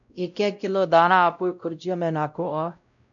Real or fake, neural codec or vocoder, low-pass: fake; codec, 16 kHz, 0.5 kbps, X-Codec, WavLM features, trained on Multilingual LibriSpeech; 7.2 kHz